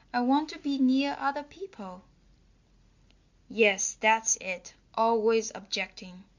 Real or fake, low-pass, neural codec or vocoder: real; 7.2 kHz; none